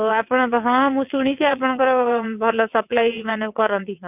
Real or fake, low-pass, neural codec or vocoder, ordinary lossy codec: fake; 3.6 kHz; vocoder, 22.05 kHz, 80 mel bands, WaveNeXt; none